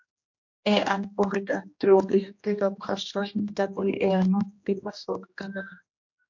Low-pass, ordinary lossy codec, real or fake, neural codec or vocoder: 7.2 kHz; MP3, 48 kbps; fake; codec, 16 kHz, 1 kbps, X-Codec, HuBERT features, trained on general audio